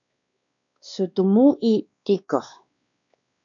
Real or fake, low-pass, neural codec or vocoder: fake; 7.2 kHz; codec, 16 kHz, 2 kbps, X-Codec, WavLM features, trained on Multilingual LibriSpeech